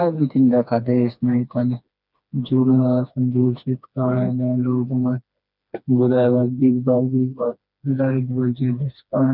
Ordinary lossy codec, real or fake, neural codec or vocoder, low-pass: none; fake; codec, 16 kHz, 2 kbps, FreqCodec, smaller model; 5.4 kHz